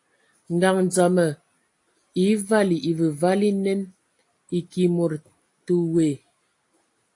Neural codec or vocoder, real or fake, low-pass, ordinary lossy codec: none; real; 10.8 kHz; AAC, 48 kbps